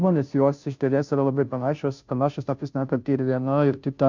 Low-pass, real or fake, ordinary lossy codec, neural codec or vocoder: 7.2 kHz; fake; MP3, 64 kbps; codec, 16 kHz, 0.5 kbps, FunCodec, trained on Chinese and English, 25 frames a second